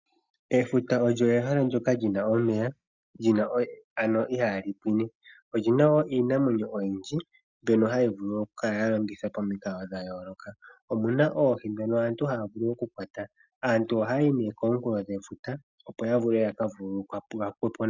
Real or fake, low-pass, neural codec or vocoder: real; 7.2 kHz; none